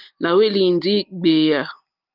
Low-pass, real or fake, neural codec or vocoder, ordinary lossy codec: 5.4 kHz; real; none; Opus, 32 kbps